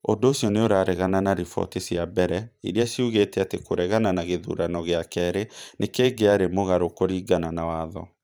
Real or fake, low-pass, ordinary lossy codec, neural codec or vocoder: fake; none; none; vocoder, 44.1 kHz, 128 mel bands every 512 samples, BigVGAN v2